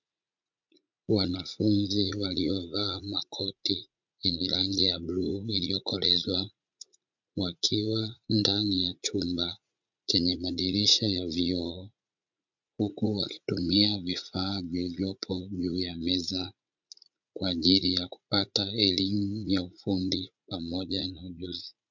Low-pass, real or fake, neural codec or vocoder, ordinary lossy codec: 7.2 kHz; fake; vocoder, 44.1 kHz, 80 mel bands, Vocos; MP3, 64 kbps